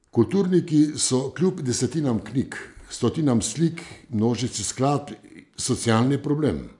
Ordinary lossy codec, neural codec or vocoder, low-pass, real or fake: none; none; 10.8 kHz; real